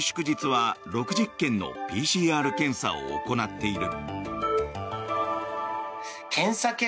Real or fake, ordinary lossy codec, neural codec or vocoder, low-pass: real; none; none; none